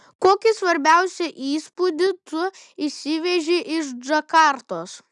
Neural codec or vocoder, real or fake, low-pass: none; real; 10.8 kHz